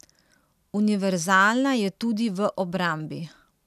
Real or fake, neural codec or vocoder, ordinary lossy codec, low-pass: real; none; none; 14.4 kHz